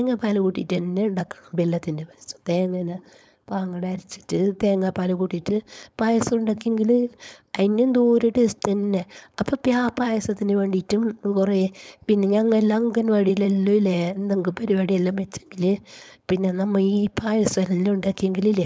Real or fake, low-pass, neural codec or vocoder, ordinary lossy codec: fake; none; codec, 16 kHz, 4.8 kbps, FACodec; none